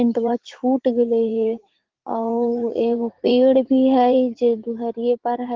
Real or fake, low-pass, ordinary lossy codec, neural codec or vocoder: fake; 7.2 kHz; Opus, 16 kbps; vocoder, 44.1 kHz, 80 mel bands, Vocos